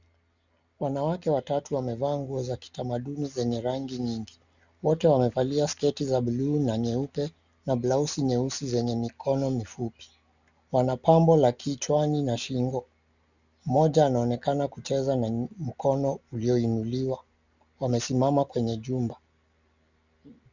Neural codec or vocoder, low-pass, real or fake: none; 7.2 kHz; real